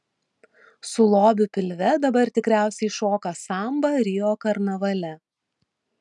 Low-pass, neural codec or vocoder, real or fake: 10.8 kHz; none; real